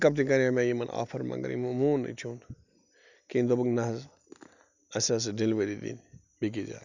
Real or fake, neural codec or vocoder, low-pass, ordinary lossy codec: real; none; 7.2 kHz; none